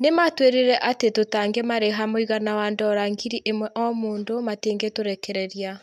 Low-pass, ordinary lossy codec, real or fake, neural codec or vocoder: 14.4 kHz; none; real; none